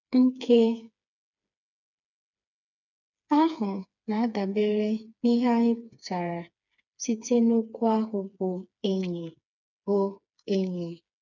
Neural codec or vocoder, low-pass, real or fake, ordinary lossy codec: codec, 16 kHz, 4 kbps, FreqCodec, smaller model; 7.2 kHz; fake; none